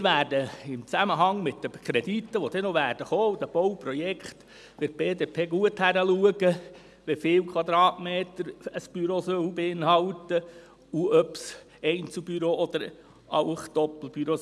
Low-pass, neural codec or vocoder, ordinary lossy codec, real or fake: none; none; none; real